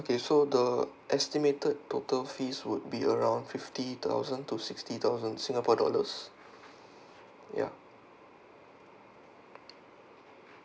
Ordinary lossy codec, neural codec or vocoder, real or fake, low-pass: none; none; real; none